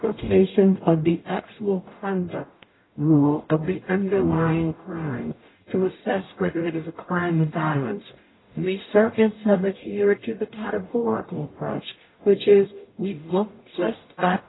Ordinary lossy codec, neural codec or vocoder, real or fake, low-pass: AAC, 16 kbps; codec, 44.1 kHz, 0.9 kbps, DAC; fake; 7.2 kHz